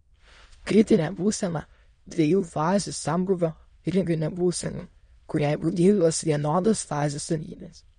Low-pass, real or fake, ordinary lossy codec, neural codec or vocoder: 9.9 kHz; fake; MP3, 48 kbps; autoencoder, 22.05 kHz, a latent of 192 numbers a frame, VITS, trained on many speakers